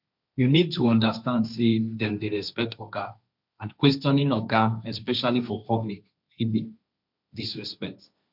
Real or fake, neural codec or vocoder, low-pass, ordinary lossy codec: fake; codec, 16 kHz, 1.1 kbps, Voila-Tokenizer; 5.4 kHz; none